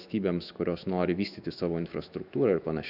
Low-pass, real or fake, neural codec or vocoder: 5.4 kHz; fake; autoencoder, 48 kHz, 128 numbers a frame, DAC-VAE, trained on Japanese speech